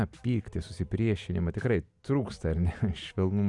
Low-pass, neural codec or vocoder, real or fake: 10.8 kHz; none; real